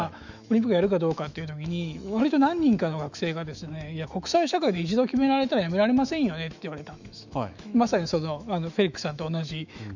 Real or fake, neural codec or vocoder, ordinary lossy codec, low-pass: fake; autoencoder, 48 kHz, 128 numbers a frame, DAC-VAE, trained on Japanese speech; none; 7.2 kHz